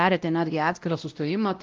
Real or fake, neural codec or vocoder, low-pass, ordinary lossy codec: fake; codec, 16 kHz, 0.5 kbps, X-Codec, WavLM features, trained on Multilingual LibriSpeech; 7.2 kHz; Opus, 32 kbps